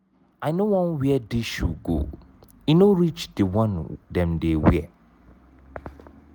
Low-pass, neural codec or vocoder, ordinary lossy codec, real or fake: none; none; none; real